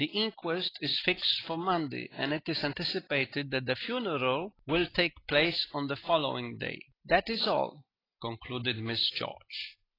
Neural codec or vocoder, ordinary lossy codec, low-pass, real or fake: none; AAC, 24 kbps; 5.4 kHz; real